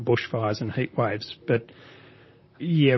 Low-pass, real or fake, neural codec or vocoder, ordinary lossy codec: 7.2 kHz; fake; vocoder, 44.1 kHz, 128 mel bands, Pupu-Vocoder; MP3, 24 kbps